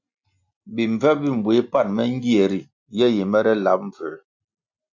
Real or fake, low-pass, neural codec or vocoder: real; 7.2 kHz; none